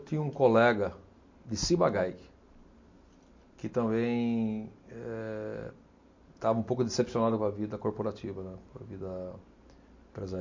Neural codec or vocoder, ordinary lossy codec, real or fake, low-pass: none; MP3, 48 kbps; real; 7.2 kHz